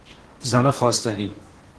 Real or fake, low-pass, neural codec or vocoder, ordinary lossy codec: fake; 10.8 kHz; codec, 16 kHz in and 24 kHz out, 0.8 kbps, FocalCodec, streaming, 65536 codes; Opus, 16 kbps